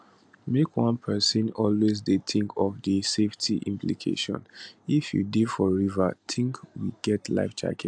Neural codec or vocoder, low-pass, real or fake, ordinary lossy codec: none; 9.9 kHz; real; AAC, 64 kbps